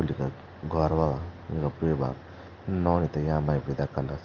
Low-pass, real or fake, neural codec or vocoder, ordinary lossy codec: 7.2 kHz; real; none; Opus, 32 kbps